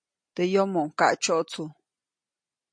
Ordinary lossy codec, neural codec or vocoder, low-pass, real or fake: MP3, 48 kbps; none; 9.9 kHz; real